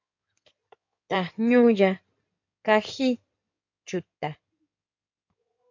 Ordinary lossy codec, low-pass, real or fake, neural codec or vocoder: MP3, 48 kbps; 7.2 kHz; fake; codec, 16 kHz in and 24 kHz out, 2.2 kbps, FireRedTTS-2 codec